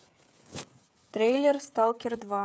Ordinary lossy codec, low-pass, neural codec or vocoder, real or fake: none; none; codec, 16 kHz, 16 kbps, FreqCodec, smaller model; fake